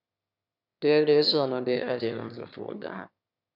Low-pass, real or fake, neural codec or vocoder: 5.4 kHz; fake; autoencoder, 22.05 kHz, a latent of 192 numbers a frame, VITS, trained on one speaker